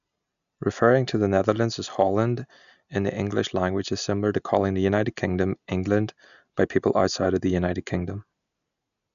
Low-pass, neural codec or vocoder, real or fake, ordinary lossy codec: 7.2 kHz; none; real; none